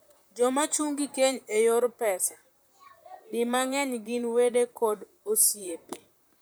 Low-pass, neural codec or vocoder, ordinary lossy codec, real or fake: none; vocoder, 44.1 kHz, 128 mel bands, Pupu-Vocoder; none; fake